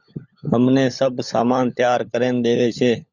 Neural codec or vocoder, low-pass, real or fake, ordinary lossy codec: codec, 16 kHz, 16 kbps, FunCodec, trained on LibriTTS, 50 frames a second; 7.2 kHz; fake; Opus, 64 kbps